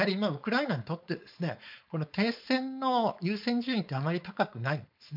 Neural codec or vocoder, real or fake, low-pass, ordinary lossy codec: codec, 16 kHz, 4.8 kbps, FACodec; fake; 5.4 kHz; MP3, 48 kbps